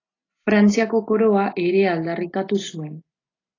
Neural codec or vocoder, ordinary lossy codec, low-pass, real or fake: none; AAC, 32 kbps; 7.2 kHz; real